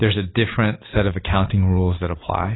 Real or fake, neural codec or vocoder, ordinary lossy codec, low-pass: real; none; AAC, 16 kbps; 7.2 kHz